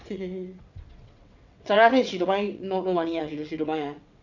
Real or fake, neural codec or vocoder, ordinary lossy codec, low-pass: fake; vocoder, 22.05 kHz, 80 mel bands, WaveNeXt; none; 7.2 kHz